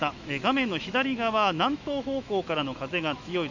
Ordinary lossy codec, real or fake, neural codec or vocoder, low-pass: none; fake; autoencoder, 48 kHz, 128 numbers a frame, DAC-VAE, trained on Japanese speech; 7.2 kHz